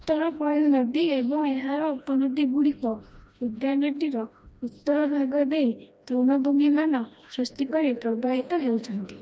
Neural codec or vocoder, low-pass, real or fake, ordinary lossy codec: codec, 16 kHz, 1 kbps, FreqCodec, smaller model; none; fake; none